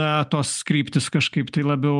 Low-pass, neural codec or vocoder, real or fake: 10.8 kHz; none; real